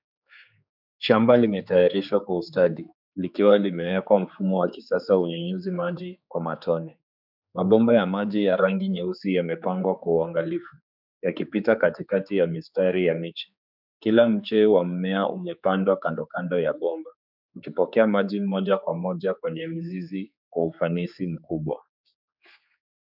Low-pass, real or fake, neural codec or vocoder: 5.4 kHz; fake; codec, 16 kHz, 4 kbps, X-Codec, HuBERT features, trained on general audio